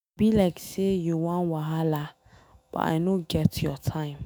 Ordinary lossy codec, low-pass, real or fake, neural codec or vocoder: none; none; fake; autoencoder, 48 kHz, 128 numbers a frame, DAC-VAE, trained on Japanese speech